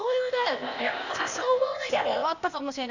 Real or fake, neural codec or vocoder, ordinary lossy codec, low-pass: fake; codec, 16 kHz, 0.8 kbps, ZipCodec; Opus, 64 kbps; 7.2 kHz